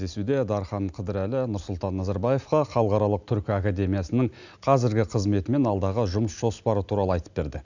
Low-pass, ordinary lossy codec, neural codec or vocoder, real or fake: 7.2 kHz; none; none; real